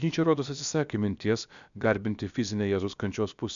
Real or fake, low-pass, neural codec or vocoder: fake; 7.2 kHz; codec, 16 kHz, about 1 kbps, DyCAST, with the encoder's durations